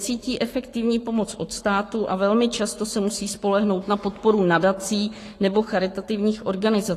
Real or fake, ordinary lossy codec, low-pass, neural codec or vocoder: fake; AAC, 48 kbps; 14.4 kHz; codec, 44.1 kHz, 7.8 kbps, Pupu-Codec